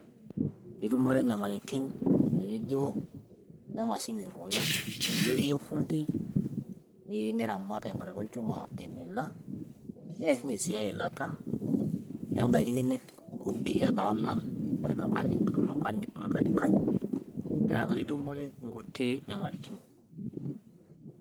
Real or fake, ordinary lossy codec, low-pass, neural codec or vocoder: fake; none; none; codec, 44.1 kHz, 1.7 kbps, Pupu-Codec